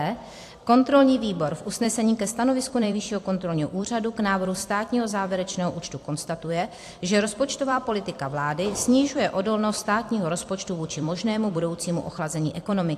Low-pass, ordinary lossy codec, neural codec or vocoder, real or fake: 14.4 kHz; AAC, 64 kbps; vocoder, 44.1 kHz, 128 mel bands every 256 samples, BigVGAN v2; fake